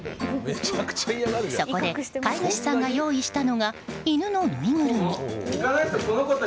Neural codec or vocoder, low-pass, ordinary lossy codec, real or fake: none; none; none; real